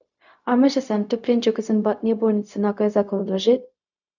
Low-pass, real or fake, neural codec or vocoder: 7.2 kHz; fake; codec, 16 kHz, 0.4 kbps, LongCat-Audio-Codec